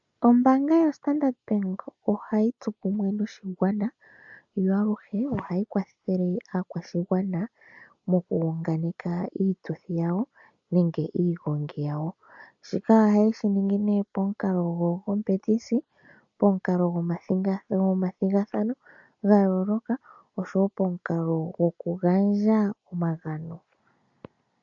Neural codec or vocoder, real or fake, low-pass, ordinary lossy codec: none; real; 7.2 kHz; AAC, 64 kbps